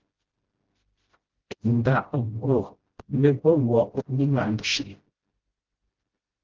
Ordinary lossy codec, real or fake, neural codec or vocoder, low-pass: Opus, 16 kbps; fake; codec, 16 kHz, 0.5 kbps, FreqCodec, smaller model; 7.2 kHz